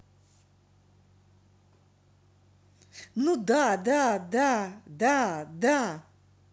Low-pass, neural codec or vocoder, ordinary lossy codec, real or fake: none; none; none; real